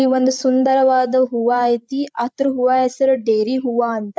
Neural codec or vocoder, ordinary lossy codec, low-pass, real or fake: codec, 16 kHz, 16 kbps, FreqCodec, larger model; none; none; fake